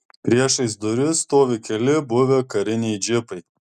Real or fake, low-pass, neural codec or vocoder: real; 14.4 kHz; none